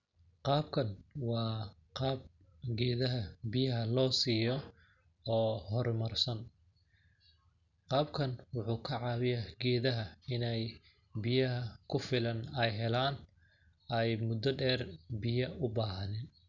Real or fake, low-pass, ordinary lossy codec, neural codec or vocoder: real; 7.2 kHz; none; none